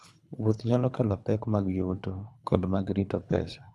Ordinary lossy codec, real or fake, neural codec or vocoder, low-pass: none; fake; codec, 24 kHz, 3 kbps, HILCodec; none